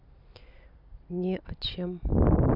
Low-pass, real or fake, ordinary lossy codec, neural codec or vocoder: 5.4 kHz; fake; none; vocoder, 44.1 kHz, 128 mel bands every 256 samples, BigVGAN v2